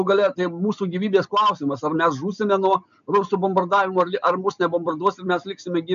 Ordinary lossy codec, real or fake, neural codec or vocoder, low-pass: MP3, 64 kbps; real; none; 7.2 kHz